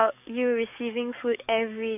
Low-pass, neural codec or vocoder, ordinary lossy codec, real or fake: 3.6 kHz; codec, 44.1 kHz, 7.8 kbps, DAC; none; fake